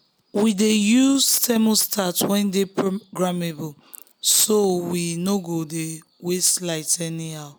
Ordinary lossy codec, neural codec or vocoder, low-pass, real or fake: none; none; none; real